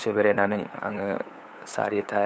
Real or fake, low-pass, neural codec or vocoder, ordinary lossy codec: fake; none; codec, 16 kHz, 8 kbps, FunCodec, trained on LibriTTS, 25 frames a second; none